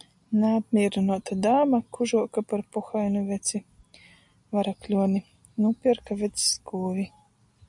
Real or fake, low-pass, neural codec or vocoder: real; 10.8 kHz; none